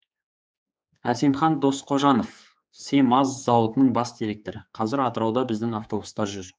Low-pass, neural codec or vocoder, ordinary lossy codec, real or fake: none; codec, 16 kHz, 4 kbps, X-Codec, HuBERT features, trained on general audio; none; fake